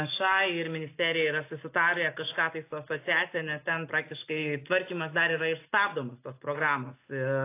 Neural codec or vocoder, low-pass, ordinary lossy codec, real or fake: none; 3.6 kHz; AAC, 24 kbps; real